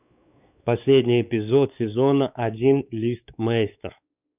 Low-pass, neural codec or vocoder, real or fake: 3.6 kHz; codec, 16 kHz, 4 kbps, X-Codec, WavLM features, trained on Multilingual LibriSpeech; fake